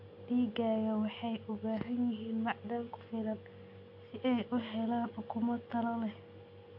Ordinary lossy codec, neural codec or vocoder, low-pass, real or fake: AAC, 48 kbps; none; 5.4 kHz; real